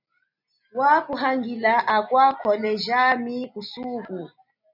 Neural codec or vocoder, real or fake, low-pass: none; real; 5.4 kHz